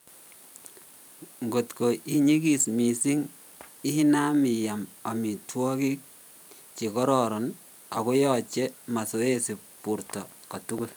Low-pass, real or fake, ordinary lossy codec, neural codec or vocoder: none; fake; none; vocoder, 44.1 kHz, 128 mel bands every 512 samples, BigVGAN v2